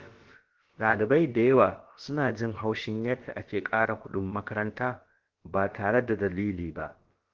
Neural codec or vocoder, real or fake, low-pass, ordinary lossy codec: codec, 16 kHz, about 1 kbps, DyCAST, with the encoder's durations; fake; 7.2 kHz; Opus, 16 kbps